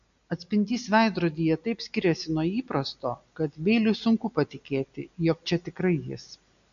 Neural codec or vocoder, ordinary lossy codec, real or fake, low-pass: none; MP3, 96 kbps; real; 7.2 kHz